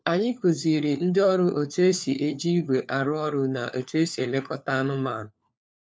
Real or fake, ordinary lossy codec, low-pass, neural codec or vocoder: fake; none; none; codec, 16 kHz, 4 kbps, FunCodec, trained on LibriTTS, 50 frames a second